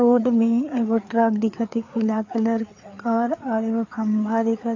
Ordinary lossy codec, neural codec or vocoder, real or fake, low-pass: AAC, 48 kbps; codec, 16 kHz, 4 kbps, FreqCodec, larger model; fake; 7.2 kHz